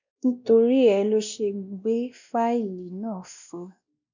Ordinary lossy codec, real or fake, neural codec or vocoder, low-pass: none; fake; codec, 16 kHz, 1 kbps, X-Codec, WavLM features, trained on Multilingual LibriSpeech; 7.2 kHz